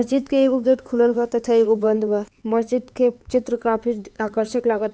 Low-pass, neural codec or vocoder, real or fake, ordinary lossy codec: none; codec, 16 kHz, 4 kbps, X-Codec, HuBERT features, trained on LibriSpeech; fake; none